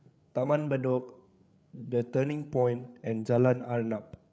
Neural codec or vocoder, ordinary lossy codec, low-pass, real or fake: codec, 16 kHz, 8 kbps, FreqCodec, larger model; none; none; fake